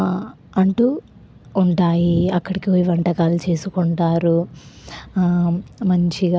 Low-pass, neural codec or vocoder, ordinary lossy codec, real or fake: none; none; none; real